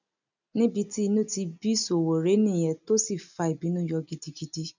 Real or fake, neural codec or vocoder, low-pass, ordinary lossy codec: real; none; 7.2 kHz; none